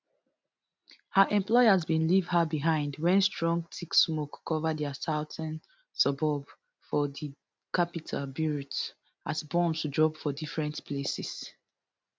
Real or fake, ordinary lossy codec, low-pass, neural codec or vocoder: real; none; none; none